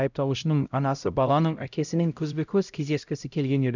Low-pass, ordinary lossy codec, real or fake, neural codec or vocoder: 7.2 kHz; none; fake; codec, 16 kHz, 0.5 kbps, X-Codec, HuBERT features, trained on LibriSpeech